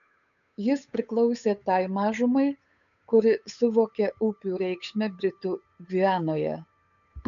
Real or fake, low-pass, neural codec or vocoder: fake; 7.2 kHz; codec, 16 kHz, 8 kbps, FunCodec, trained on Chinese and English, 25 frames a second